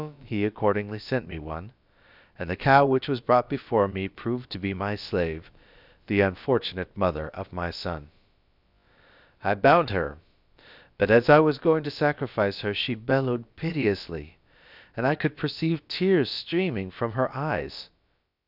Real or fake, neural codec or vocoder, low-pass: fake; codec, 16 kHz, about 1 kbps, DyCAST, with the encoder's durations; 5.4 kHz